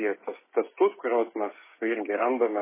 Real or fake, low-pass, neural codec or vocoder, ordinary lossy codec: fake; 3.6 kHz; codec, 16 kHz, 16 kbps, FreqCodec, smaller model; MP3, 16 kbps